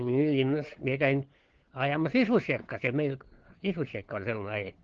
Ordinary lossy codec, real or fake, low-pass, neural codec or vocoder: Opus, 16 kbps; fake; 7.2 kHz; codec, 16 kHz, 4 kbps, FunCodec, trained on LibriTTS, 50 frames a second